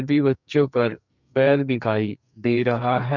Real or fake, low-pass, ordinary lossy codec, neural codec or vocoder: fake; 7.2 kHz; none; codec, 44.1 kHz, 2.6 kbps, SNAC